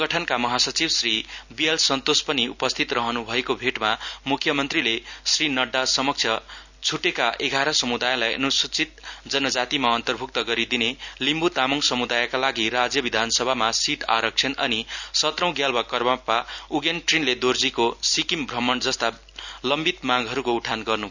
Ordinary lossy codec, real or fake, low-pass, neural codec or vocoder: none; real; 7.2 kHz; none